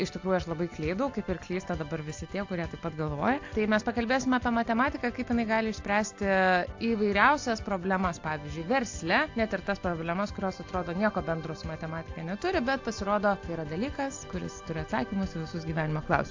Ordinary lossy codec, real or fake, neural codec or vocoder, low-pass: AAC, 48 kbps; real; none; 7.2 kHz